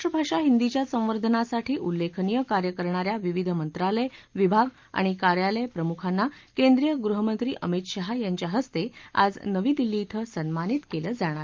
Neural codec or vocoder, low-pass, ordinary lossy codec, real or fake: none; 7.2 kHz; Opus, 32 kbps; real